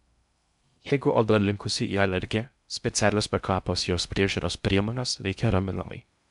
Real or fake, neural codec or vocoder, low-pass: fake; codec, 16 kHz in and 24 kHz out, 0.6 kbps, FocalCodec, streaming, 2048 codes; 10.8 kHz